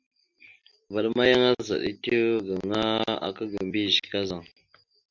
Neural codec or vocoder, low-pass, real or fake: none; 7.2 kHz; real